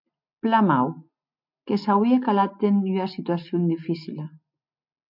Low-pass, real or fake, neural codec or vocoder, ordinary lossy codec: 5.4 kHz; real; none; MP3, 48 kbps